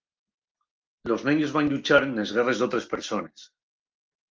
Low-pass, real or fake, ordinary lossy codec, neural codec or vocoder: 7.2 kHz; real; Opus, 24 kbps; none